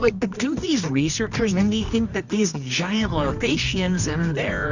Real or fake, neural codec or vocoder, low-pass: fake; codec, 24 kHz, 0.9 kbps, WavTokenizer, medium music audio release; 7.2 kHz